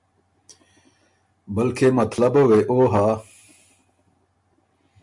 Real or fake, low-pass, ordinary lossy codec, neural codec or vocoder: real; 10.8 kHz; MP3, 64 kbps; none